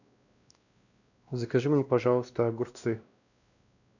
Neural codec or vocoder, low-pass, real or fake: codec, 16 kHz, 1 kbps, X-Codec, WavLM features, trained on Multilingual LibriSpeech; 7.2 kHz; fake